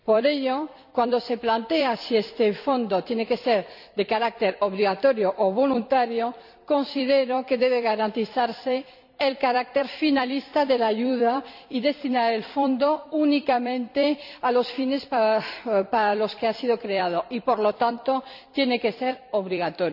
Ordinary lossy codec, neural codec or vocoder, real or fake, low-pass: none; vocoder, 44.1 kHz, 128 mel bands every 256 samples, BigVGAN v2; fake; 5.4 kHz